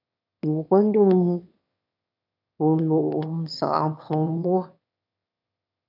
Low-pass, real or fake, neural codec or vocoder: 5.4 kHz; fake; autoencoder, 22.05 kHz, a latent of 192 numbers a frame, VITS, trained on one speaker